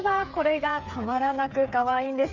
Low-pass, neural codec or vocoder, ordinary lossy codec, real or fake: 7.2 kHz; codec, 16 kHz, 8 kbps, FreqCodec, smaller model; none; fake